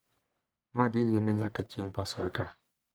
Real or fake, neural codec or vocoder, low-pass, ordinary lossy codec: fake; codec, 44.1 kHz, 1.7 kbps, Pupu-Codec; none; none